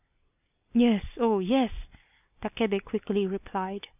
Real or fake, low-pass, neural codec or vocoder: real; 3.6 kHz; none